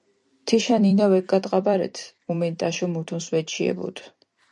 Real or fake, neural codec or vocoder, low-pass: fake; vocoder, 44.1 kHz, 128 mel bands every 256 samples, BigVGAN v2; 10.8 kHz